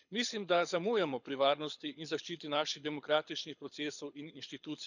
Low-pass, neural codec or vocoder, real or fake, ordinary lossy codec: 7.2 kHz; codec, 24 kHz, 6 kbps, HILCodec; fake; none